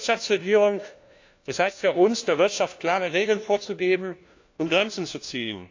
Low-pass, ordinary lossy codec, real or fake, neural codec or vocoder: 7.2 kHz; AAC, 48 kbps; fake; codec, 16 kHz, 1 kbps, FunCodec, trained on LibriTTS, 50 frames a second